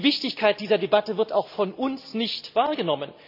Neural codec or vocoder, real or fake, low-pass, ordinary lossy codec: none; real; 5.4 kHz; AAC, 48 kbps